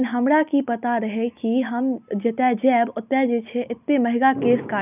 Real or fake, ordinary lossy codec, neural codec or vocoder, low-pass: real; none; none; 3.6 kHz